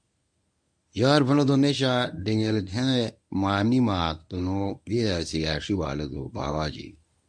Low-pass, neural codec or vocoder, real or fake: 9.9 kHz; codec, 24 kHz, 0.9 kbps, WavTokenizer, medium speech release version 1; fake